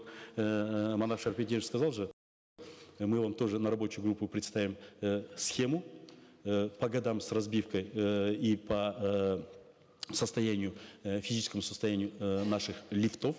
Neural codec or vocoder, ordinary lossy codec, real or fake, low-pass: none; none; real; none